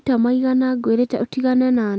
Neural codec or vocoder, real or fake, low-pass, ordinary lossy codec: none; real; none; none